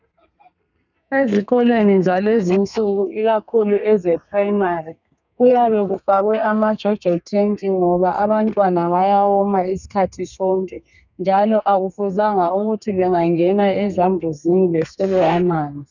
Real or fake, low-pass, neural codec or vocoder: fake; 7.2 kHz; codec, 32 kHz, 1.9 kbps, SNAC